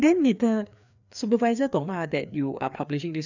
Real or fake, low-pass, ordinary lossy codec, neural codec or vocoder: fake; 7.2 kHz; none; codec, 16 kHz, 2 kbps, FreqCodec, larger model